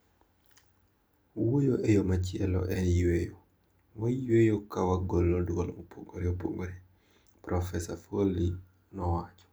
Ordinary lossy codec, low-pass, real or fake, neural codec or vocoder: none; none; real; none